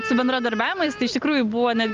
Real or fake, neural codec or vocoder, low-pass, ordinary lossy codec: real; none; 7.2 kHz; Opus, 24 kbps